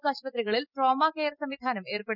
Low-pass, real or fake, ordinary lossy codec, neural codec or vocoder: 5.4 kHz; real; none; none